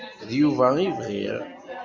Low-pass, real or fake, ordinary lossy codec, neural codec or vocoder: 7.2 kHz; real; MP3, 64 kbps; none